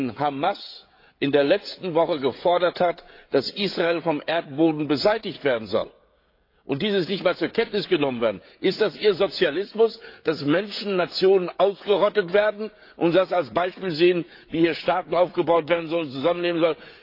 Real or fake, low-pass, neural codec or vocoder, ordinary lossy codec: fake; 5.4 kHz; codec, 16 kHz, 16 kbps, FreqCodec, smaller model; AAC, 32 kbps